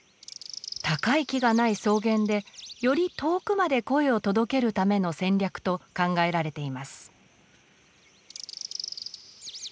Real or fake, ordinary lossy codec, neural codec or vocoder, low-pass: real; none; none; none